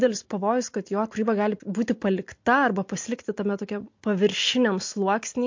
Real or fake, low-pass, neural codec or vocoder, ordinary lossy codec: real; 7.2 kHz; none; MP3, 48 kbps